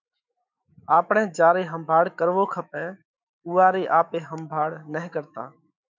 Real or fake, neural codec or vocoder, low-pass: fake; autoencoder, 48 kHz, 128 numbers a frame, DAC-VAE, trained on Japanese speech; 7.2 kHz